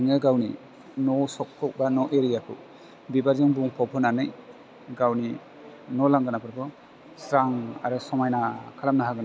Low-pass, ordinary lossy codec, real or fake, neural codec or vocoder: none; none; real; none